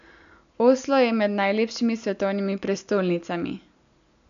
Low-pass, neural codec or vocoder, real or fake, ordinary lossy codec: 7.2 kHz; none; real; none